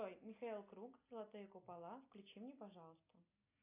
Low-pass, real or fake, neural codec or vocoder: 3.6 kHz; real; none